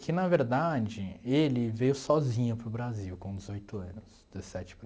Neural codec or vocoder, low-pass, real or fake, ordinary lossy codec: none; none; real; none